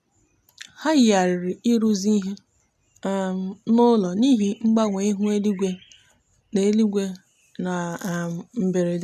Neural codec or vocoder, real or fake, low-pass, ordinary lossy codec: none; real; 14.4 kHz; none